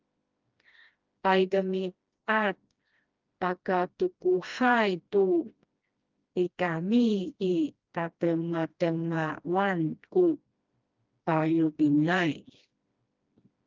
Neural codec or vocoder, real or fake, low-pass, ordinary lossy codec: codec, 16 kHz, 1 kbps, FreqCodec, smaller model; fake; 7.2 kHz; Opus, 24 kbps